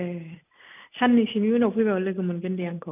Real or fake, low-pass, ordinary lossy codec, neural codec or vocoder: real; 3.6 kHz; none; none